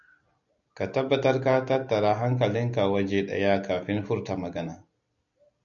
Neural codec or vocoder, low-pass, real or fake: none; 7.2 kHz; real